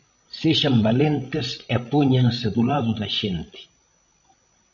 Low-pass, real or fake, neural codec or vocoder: 7.2 kHz; fake; codec, 16 kHz, 16 kbps, FreqCodec, larger model